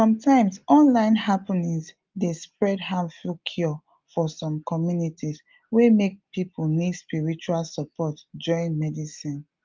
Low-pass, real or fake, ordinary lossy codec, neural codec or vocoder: 7.2 kHz; real; Opus, 32 kbps; none